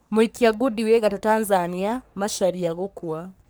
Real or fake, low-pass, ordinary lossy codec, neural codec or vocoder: fake; none; none; codec, 44.1 kHz, 3.4 kbps, Pupu-Codec